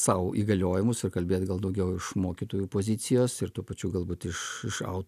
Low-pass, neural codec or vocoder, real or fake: 14.4 kHz; none; real